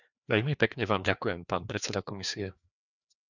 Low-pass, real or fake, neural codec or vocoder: 7.2 kHz; fake; codec, 16 kHz, 2 kbps, FreqCodec, larger model